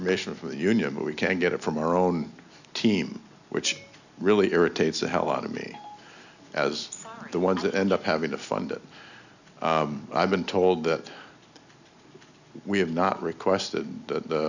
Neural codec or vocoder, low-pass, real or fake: none; 7.2 kHz; real